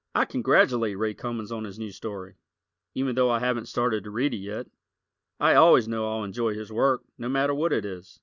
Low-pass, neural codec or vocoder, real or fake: 7.2 kHz; none; real